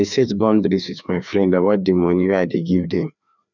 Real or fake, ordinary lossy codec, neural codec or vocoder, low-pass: fake; none; codec, 16 kHz, 2 kbps, FreqCodec, larger model; 7.2 kHz